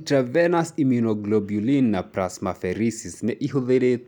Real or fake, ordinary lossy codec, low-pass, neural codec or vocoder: real; none; 19.8 kHz; none